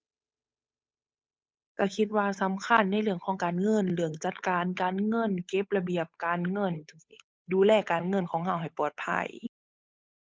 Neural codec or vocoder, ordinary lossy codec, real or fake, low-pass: codec, 16 kHz, 8 kbps, FunCodec, trained on Chinese and English, 25 frames a second; none; fake; none